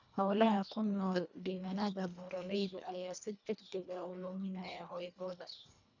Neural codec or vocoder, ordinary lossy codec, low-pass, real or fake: codec, 24 kHz, 1.5 kbps, HILCodec; none; 7.2 kHz; fake